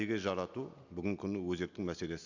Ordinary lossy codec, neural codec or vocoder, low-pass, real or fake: none; none; 7.2 kHz; real